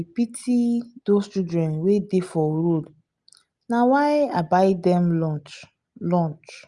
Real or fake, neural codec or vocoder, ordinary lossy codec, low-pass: real; none; Opus, 64 kbps; 10.8 kHz